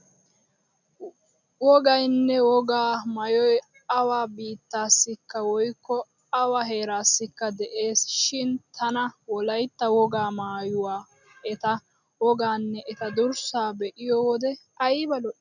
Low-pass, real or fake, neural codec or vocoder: 7.2 kHz; real; none